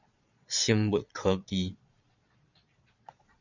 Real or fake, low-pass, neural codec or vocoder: fake; 7.2 kHz; vocoder, 22.05 kHz, 80 mel bands, Vocos